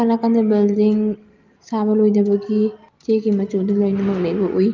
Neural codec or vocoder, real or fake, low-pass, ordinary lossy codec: none; real; 7.2 kHz; Opus, 32 kbps